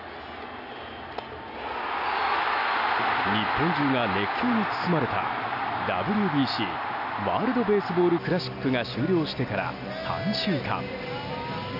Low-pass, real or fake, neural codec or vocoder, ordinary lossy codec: 5.4 kHz; real; none; none